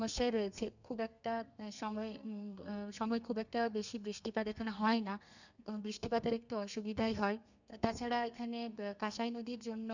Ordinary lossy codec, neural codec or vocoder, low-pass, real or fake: none; codec, 32 kHz, 1.9 kbps, SNAC; 7.2 kHz; fake